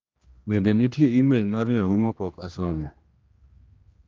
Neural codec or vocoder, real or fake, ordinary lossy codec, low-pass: codec, 16 kHz, 1 kbps, X-Codec, HuBERT features, trained on general audio; fake; Opus, 24 kbps; 7.2 kHz